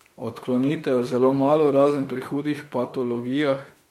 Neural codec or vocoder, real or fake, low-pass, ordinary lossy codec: autoencoder, 48 kHz, 32 numbers a frame, DAC-VAE, trained on Japanese speech; fake; 19.8 kHz; MP3, 64 kbps